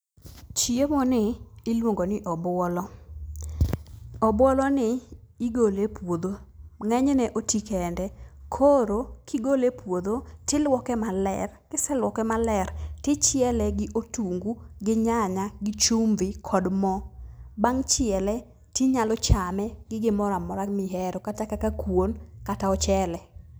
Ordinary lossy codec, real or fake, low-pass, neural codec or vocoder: none; real; none; none